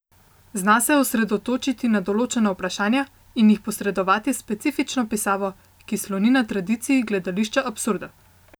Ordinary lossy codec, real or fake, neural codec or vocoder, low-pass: none; real; none; none